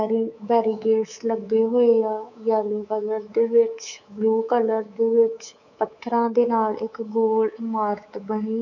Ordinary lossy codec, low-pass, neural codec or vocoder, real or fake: none; 7.2 kHz; codec, 24 kHz, 3.1 kbps, DualCodec; fake